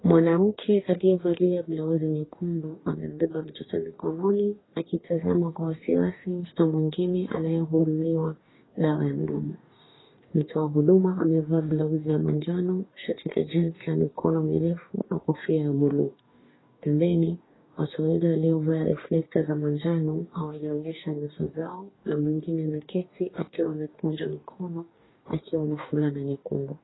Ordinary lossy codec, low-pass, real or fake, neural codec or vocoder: AAC, 16 kbps; 7.2 kHz; fake; codec, 44.1 kHz, 2.6 kbps, DAC